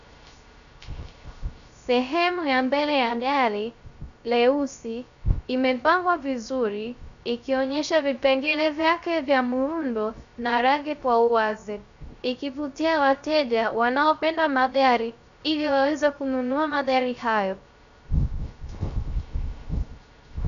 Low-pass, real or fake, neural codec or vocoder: 7.2 kHz; fake; codec, 16 kHz, 0.3 kbps, FocalCodec